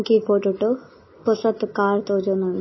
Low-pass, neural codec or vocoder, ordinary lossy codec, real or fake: 7.2 kHz; none; MP3, 24 kbps; real